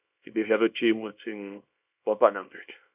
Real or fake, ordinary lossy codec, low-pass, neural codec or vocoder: fake; none; 3.6 kHz; codec, 24 kHz, 0.9 kbps, WavTokenizer, small release